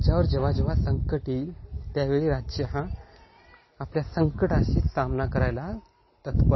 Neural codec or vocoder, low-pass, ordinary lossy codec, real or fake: none; 7.2 kHz; MP3, 24 kbps; real